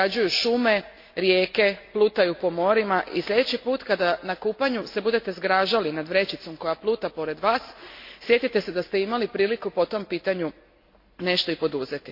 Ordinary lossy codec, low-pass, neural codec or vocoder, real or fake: MP3, 32 kbps; 5.4 kHz; none; real